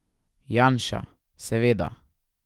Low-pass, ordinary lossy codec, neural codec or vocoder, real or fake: 19.8 kHz; Opus, 24 kbps; autoencoder, 48 kHz, 128 numbers a frame, DAC-VAE, trained on Japanese speech; fake